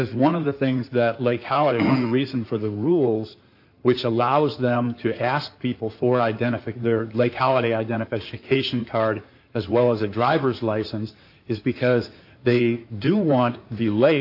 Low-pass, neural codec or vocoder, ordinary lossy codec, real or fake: 5.4 kHz; codec, 16 kHz in and 24 kHz out, 2.2 kbps, FireRedTTS-2 codec; AAC, 48 kbps; fake